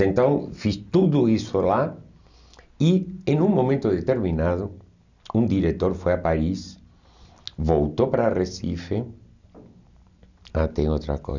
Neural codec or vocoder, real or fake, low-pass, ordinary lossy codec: none; real; 7.2 kHz; none